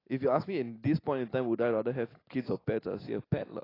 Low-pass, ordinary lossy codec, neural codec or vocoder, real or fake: 5.4 kHz; AAC, 24 kbps; none; real